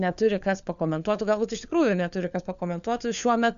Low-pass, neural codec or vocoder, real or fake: 7.2 kHz; codec, 16 kHz, 4 kbps, FunCodec, trained on LibriTTS, 50 frames a second; fake